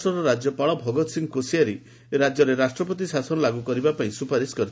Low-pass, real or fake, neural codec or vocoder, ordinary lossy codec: none; real; none; none